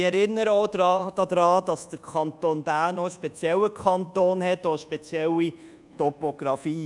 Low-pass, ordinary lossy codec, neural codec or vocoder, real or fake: 10.8 kHz; none; codec, 24 kHz, 1.2 kbps, DualCodec; fake